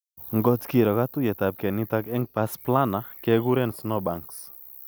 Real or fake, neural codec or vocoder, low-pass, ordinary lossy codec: real; none; none; none